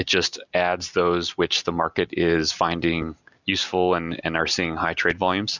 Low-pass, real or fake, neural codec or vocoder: 7.2 kHz; real; none